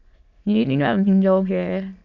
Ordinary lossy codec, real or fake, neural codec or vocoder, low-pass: MP3, 64 kbps; fake; autoencoder, 22.05 kHz, a latent of 192 numbers a frame, VITS, trained on many speakers; 7.2 kHz